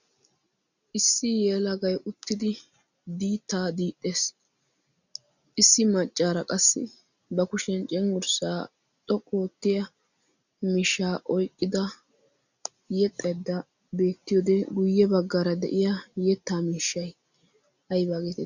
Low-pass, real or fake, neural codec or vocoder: 7.2 kHz; real; none